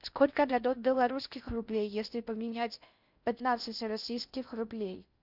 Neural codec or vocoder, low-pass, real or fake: codec, 16 kHz in and 24 kHz out, 0.6 kbps, FocalCodec, streaming, 4096 codes; 5.4 kHz; fake